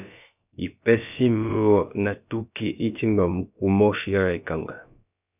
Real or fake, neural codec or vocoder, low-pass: fake; codec, 16 kHz, about 1 kbps, DyCAST, with the encoder's durations; 3.6 kHz